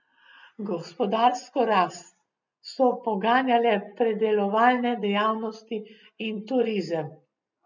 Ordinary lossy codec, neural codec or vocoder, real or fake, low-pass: none; none; real; 7.2 kHz